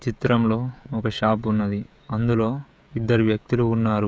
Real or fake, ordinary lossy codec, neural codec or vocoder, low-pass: fake; none; codec, 16 kHz, 16 kbps, FreqCodec, smaller model; none